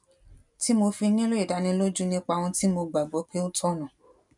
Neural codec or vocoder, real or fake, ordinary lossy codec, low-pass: none; real; none; 10.8 kHz